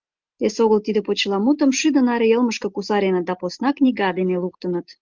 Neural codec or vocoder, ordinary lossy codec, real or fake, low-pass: none; Opus, 32 kbps; real; 7.2 kHz